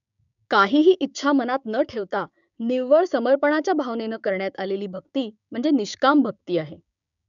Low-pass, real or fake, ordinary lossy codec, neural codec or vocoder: 7.2 kHz; fake; none; codec, 16 kHz, 6 kbps, DAC